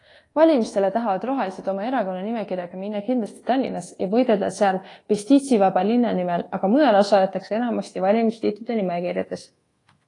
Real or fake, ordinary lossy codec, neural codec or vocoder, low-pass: fake; AAC, 32 kbps; codec, 24 kHz, 1.2 kbps, DualCodec; 10.8 kHz